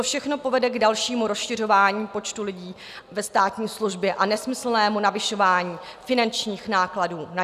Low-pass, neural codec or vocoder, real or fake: 14.4 kHz; none; real